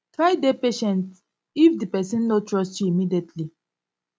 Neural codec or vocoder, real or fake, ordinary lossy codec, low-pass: none; real; none; none